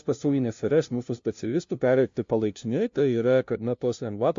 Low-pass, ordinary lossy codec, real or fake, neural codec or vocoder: 7.2 kHz; MP3, 48 kbps; fake; codec, 16 kHz, 0.5 kbps, FunCodec, trained on LibriTTS, 25 frames a second